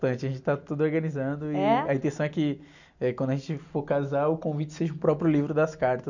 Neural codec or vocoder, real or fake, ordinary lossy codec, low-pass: none; real; none; 7.2 kHz